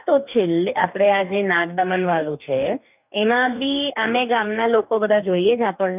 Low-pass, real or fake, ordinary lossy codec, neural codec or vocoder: 3.6 kHz; fake; none; codec, 44.1 kHz, 2.6 kbps, DAC